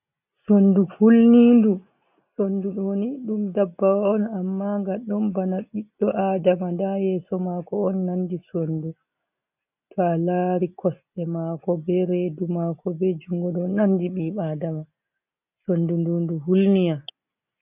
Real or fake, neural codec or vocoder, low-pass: real; none; 3.6 kHz